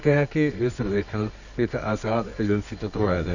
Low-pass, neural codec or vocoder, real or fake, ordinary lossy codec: 7.2 kHz; codec, 24 kHz, 0.9 kbps, WavTokenizer, medium music audio release; fake; none